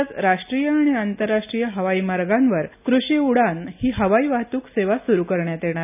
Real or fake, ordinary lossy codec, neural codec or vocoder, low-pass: real; none; none; 3.6 kHz